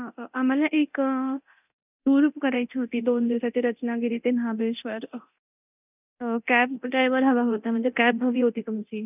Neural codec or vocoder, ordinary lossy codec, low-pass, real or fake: codec, 24 kHz, 0.9 kbps, DualCodec; none; 3.6 kHz; fake